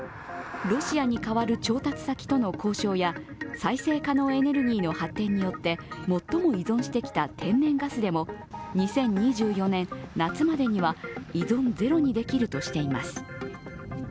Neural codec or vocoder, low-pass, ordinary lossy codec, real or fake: none; none; none; real